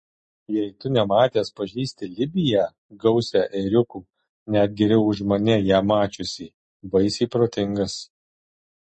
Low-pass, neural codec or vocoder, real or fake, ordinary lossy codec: 9.9 kHz; none; real; MP3, 32 kbps